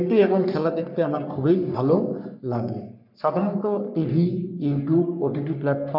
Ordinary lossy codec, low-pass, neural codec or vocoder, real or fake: none; 5.4 kHz; codec, 44.1 kHz, 3.4 kbps, Pupu-Codec; fake